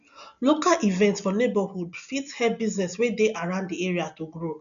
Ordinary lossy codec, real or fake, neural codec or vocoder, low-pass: none; real; none; 7.2 kHz